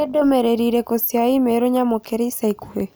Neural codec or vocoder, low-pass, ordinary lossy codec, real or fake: none; none; none; real